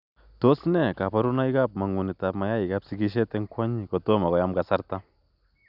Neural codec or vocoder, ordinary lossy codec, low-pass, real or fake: none; none; 5.4 kHz; real